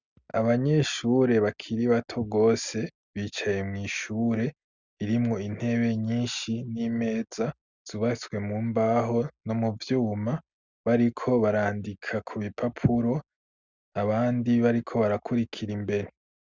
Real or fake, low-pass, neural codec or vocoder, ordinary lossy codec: real; 7.2 kHz; none; Opus, 64 kbps